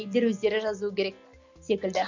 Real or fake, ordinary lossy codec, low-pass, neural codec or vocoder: real; none; 7.2 kHz; none